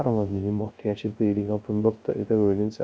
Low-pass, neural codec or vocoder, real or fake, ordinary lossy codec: none; codec, 16 kHz, 0.3 kbps, FocalCodec; fake; none